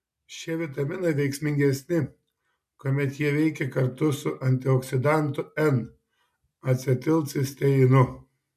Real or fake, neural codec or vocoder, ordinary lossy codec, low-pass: real; none; MP3, 96 kbps; 14.4 kHz